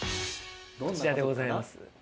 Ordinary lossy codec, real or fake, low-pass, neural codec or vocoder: none; real; none; none